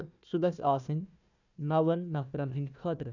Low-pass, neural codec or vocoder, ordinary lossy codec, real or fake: 7.2 kHz; codec, 16 kHz, 1 kbps, FunCodec, trained on Chinese and English, 50 frames a second; none; fake